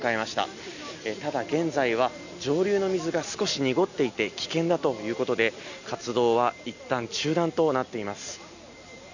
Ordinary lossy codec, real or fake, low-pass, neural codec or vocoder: none; real; 7.2 kHz; none